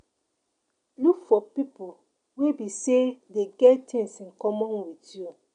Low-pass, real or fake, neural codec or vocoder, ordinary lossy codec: 9.9 kHz; real; none; none